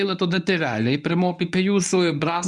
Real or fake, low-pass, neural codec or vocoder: fake; 10.8 kHz; codec, 24 kHz, 0.9 kbps, WavTokenizer, medium speech release version 1